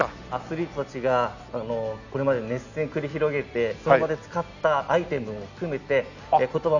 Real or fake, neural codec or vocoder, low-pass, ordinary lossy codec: real; none; 7.2 kHz; none